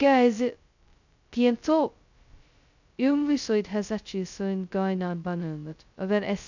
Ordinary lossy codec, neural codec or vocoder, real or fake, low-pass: none; codec, 16 kHz, 0.2 kbps, FocalCodec; fake; 7.2 kHz